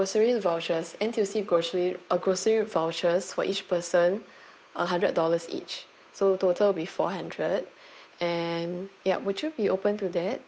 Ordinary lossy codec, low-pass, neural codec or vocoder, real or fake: none; none; codec, 16 kHz, 8 kbps, FunCodec, trained on Chinese and English, 25 frames a second; fake